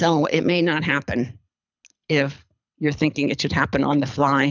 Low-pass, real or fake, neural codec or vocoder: 7.2 kHz; fake; codec, 24 kHz, 6 kbps, HILCodec